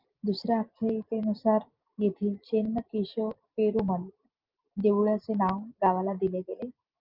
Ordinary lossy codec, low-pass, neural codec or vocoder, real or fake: Opus, 24 kbps; 5.4 kHz; none; real